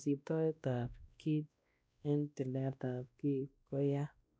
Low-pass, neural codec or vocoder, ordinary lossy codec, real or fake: none; codec, 16 kHz, 1 kbps, X-Codec, WavLM features, trained on Multilingual LibriSpeech; none; fake